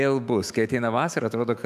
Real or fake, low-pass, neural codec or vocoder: fake; 14.4 kHz; autoencoder, 48 kHz, 128 numbers a frame, DAC-VAE, trained on Japanese speech